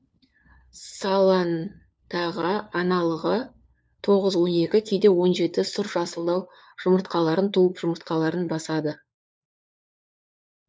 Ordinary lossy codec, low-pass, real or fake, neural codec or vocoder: none; none; fake; codec, 16 kHz, 4 kbps, FunCodec, trained on LibriTTS, 50 frames a second